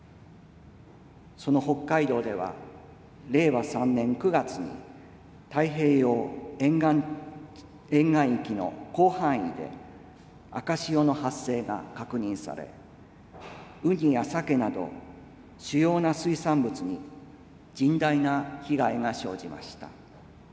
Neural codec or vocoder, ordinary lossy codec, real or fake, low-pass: none; none; real; none